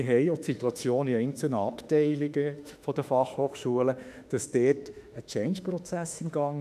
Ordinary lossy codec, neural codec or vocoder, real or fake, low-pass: none; autoencoder, 48 kHz, 32 numbers a frame, DAC-VAE, trained on Japanese speech; fake; 14.4 kHz